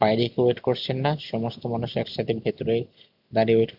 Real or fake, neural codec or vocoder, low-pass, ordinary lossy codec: real; none; 5.4 kHz; none